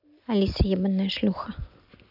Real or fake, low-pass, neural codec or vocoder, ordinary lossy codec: real; 5.4 kHz; none; none